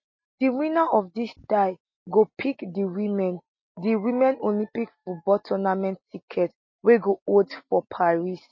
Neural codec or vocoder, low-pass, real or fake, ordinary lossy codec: none; 7.2 kHz; real; MP3, 32 kbps